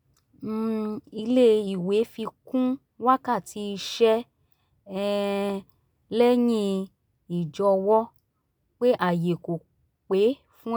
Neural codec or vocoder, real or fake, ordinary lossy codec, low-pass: none; real; none; none